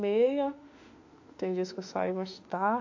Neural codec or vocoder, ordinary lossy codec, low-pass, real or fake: autoencoder, 48 kHz, 32 numbers a frame, DAC-VAE, trained on Japanese speech; AAC, 48 kbps; 7.2 kHz; fake